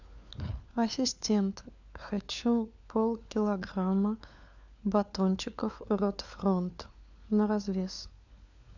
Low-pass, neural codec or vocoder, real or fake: 7.2 kHz; codec, 16 kHz, 4 kbps, FunCodec, trained on LibriTTS, 50 frames a second; fake